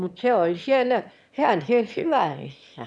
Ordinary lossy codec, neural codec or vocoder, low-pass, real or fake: none; autoencoder, 22.05 kHz, a latent of 192 numbers a frame, VITS, trained on one speaker; none; fake